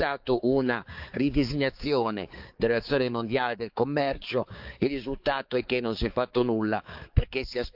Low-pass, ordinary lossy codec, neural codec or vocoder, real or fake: 5.4 kHz; Opus, 32 kbps; codec, 16 kHz, 4 kbps, X-Codec, HuBERT features, trained on balanced general audio; fake